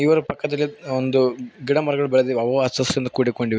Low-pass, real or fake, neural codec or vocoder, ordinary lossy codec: none; real; none; none